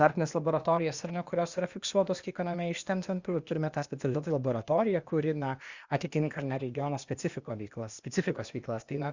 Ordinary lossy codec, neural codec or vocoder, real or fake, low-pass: Opus, 64 kbps; codec, 16 kHz, 0.8 kbps, ZipCodec; fake; 7.2 kHz